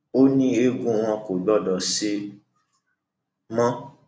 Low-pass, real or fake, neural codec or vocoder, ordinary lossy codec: none; real; none; none